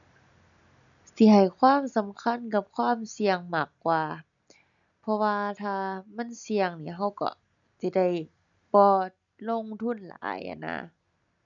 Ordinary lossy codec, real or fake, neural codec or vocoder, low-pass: none; real; none; 7.2 kHz